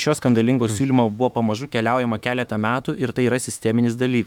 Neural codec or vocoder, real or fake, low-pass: autoencoder, 48 kHz, 32 numbers a frame, DAC-VAE, trained on Japanese speech; fake; 19.8 kHz